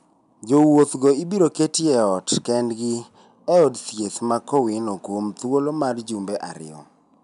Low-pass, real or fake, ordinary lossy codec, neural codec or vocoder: 10.8 kHz; real; none; none